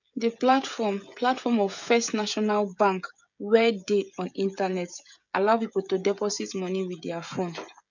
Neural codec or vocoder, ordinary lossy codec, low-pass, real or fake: codec, 16 kHz, 16 kbps, FreqCodec, smaller model; none; 7.2 kHz; fake